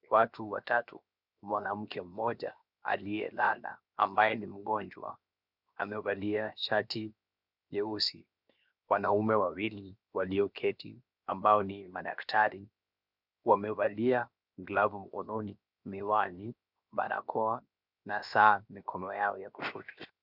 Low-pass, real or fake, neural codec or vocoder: 5.4 kHz; fake; codec, 16 kHz, 0.7 kbps, FocalCodec